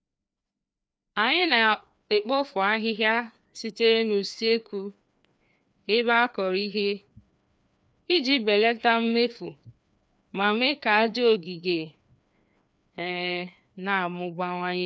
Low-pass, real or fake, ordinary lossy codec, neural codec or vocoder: none; fake; none; codec, 16 kHz, 2 kbps, FreqCodec, larger model